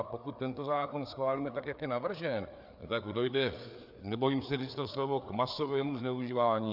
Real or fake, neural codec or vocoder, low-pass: fake; codec, 16 kHz, 4 kbps, FreqCodec, larger model; 5.4 kHz